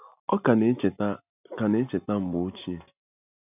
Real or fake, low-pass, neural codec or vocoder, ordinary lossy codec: real; 3.6 kHz; none; none